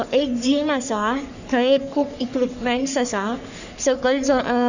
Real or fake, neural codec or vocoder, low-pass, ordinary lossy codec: fake; codec, 44.1 kHz, 3.4 kbps, Pupu-Codec; 7.2 kHz; none